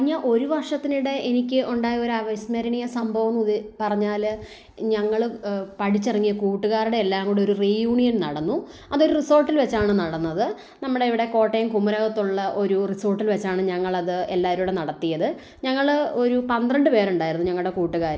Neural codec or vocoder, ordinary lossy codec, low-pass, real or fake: none; none; none; real